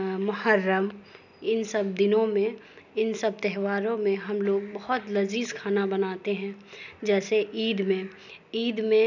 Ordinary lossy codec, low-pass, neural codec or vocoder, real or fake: none; 7.2 kHz; none; real